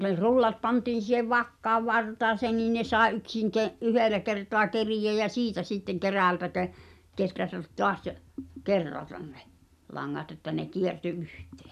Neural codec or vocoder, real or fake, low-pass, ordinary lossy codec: none; real; 14.4 kHz; none